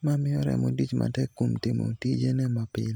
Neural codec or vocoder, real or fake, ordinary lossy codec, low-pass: none; real; none; none